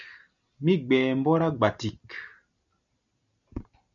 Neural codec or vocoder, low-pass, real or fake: none; 7.2 kHz; real